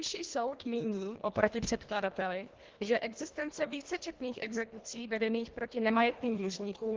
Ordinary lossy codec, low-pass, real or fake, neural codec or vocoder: Opus, 16 kbps; 7.2 kHz; fake; codec, 24 kHz, 1.5 kbps, HILCodec